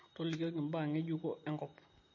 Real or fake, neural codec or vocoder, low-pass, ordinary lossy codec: real; none; 7.2 kHz; MP3, 32 kbps